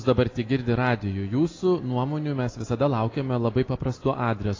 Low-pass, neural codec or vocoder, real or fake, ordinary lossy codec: 7.2 kHz; none; real; AAC, 32 kbps